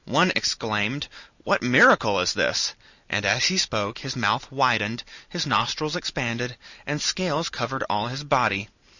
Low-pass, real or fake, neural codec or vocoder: 7.2 kHz; real; none